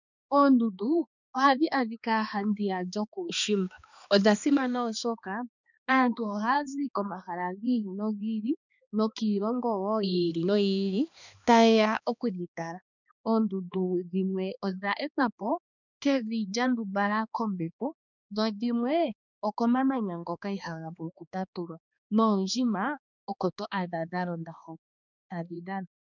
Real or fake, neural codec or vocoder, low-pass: fake; codec, 16 kHz, 2 kbps, X-Codec, HuBERT features, trained on balanced general audio; 7.2 kHz